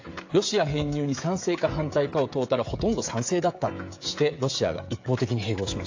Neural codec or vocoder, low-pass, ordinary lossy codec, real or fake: codec, 16 kHz, 16 kbps, FreqCodec, smaller model; 7.2 kHz; AAC, 48 kbps; fake